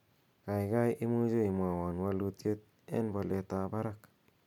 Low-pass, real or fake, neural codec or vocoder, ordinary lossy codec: 19.8 kHz; real; none; MP3, 96 kbps